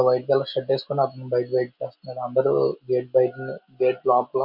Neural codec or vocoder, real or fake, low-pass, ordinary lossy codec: none; real; 5.4 kHz; none